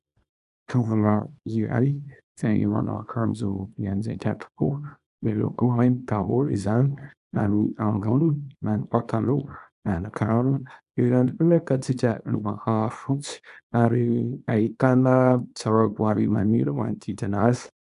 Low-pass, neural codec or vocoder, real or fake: 10.8 kHz; codec, 24 kHz, 0.9 kbps, WavTokenizer, small release; fake